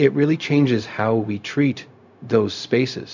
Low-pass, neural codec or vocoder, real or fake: 7.2 kHz; codec, 16 kHz, 0.4 kbps, LongCat-Audio-Codec; fake